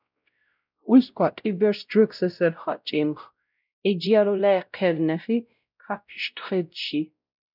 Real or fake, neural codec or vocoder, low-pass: fake; codec, 16 kHz, 0.5 kbps, X-Codec, WavLM features, trained on Multilingual LibriSpeech; 5.4 kHz